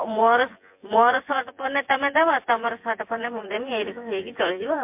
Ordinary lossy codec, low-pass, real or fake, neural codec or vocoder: MP3, 32 kbps; 3.6 kHz; fake; vocoder, 24 kHz, 100 mel bands, Vocos